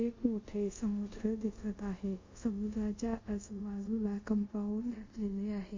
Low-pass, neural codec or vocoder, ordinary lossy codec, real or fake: 7.2 kHz; codec, 24 kHz, 0.5 kbps, DualCodec; MP3, 48 kbps; fake